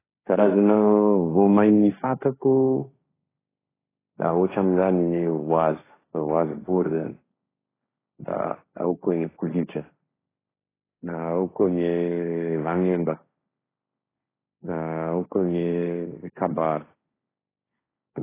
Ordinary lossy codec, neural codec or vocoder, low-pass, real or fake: AAC, 16 kbps; codec, 16 kHz, 1.1 kbps, Voila-Tokenizer; 3.6 kHz; fake